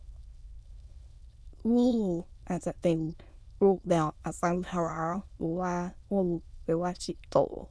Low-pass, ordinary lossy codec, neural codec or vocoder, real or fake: none; none; autoencoder, 22.05 kHz, a latent of 192 numbers a frame, VITS, trained on many speakers; fake